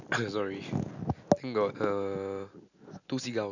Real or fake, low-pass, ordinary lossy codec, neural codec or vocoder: real; 7.2 kHz; none; none